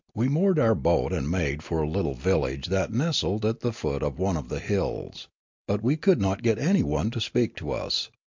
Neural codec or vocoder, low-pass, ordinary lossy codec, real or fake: none; 7.2 kHz; MP3, 64 kbps; real